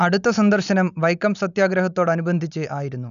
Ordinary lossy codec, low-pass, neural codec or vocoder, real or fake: none; 7.2 kHz; none; real